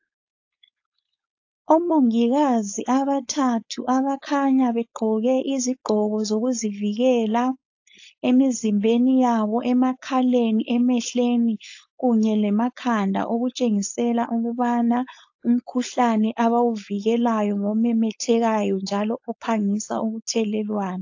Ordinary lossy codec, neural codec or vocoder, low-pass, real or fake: AAC, 48 kbps; codec, 16 kHz, 4.8 kbps, FACodec; 7.2 kHz; fake